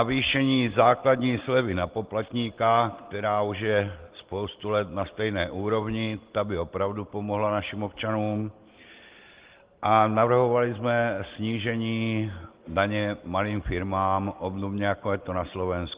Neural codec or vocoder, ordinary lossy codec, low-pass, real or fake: none; Opus, 16 kbps; 3.6 kHz; real